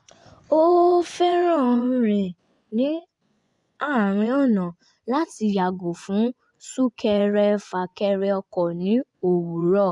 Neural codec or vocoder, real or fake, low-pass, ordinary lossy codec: vocoder, 24 kHz, 100 mel bands, Vocos; fake; 10.8 kHz; none